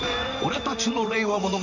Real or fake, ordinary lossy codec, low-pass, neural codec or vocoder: fake; none; 7.2 kHz; vocoder, 44.1 kHz, 128 mel bands, Pupu-Vocoder